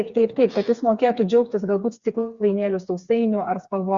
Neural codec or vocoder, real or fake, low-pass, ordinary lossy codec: codec, 16 kHz, 4 kbps, FreqCodec, smaller model; fake; 7.2 kHz; Opus, 64 kbps